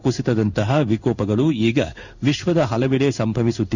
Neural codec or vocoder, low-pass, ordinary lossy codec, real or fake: codec, 16 kHz in and 24 kHz out, 1 kbps, XY-Tokenizer; 7.2 kHz; none; fake